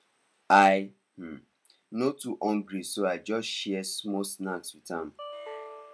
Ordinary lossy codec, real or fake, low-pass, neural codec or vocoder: none; real; none; none